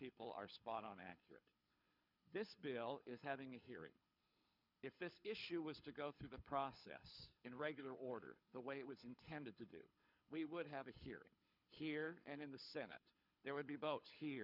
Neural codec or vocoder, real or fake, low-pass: codec, 24 kHz, 6 kbps, HILCodec; fake; 5.4 kHz